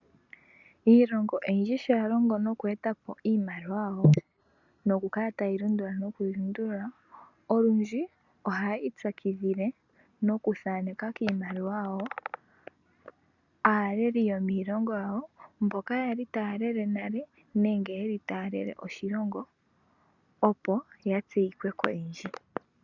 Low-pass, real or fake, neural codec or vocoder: 7.2 kHz; real; none